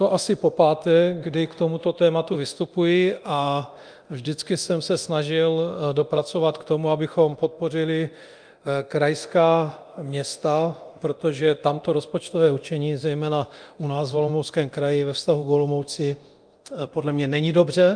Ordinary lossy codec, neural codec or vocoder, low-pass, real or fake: Opus, 32 kbps; codec, 24 kHz, 0.9 kbps, DualCodec; 9.9 kHz; fake